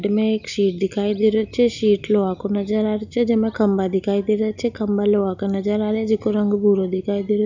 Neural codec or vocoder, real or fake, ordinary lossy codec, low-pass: none; real; none; 7.2 kHz